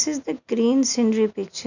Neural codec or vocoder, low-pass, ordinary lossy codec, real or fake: none; 7.2 kHz; none; real